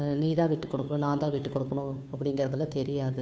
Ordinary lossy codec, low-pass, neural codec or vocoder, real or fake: none; none; codec, 16 kHz, 2 kbps, FunCodec, trained on Chinese and English, 25 frames a second; fake